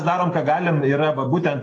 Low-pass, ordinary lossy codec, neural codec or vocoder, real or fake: 9.9 kHz; AAC, 32 kbps; none; real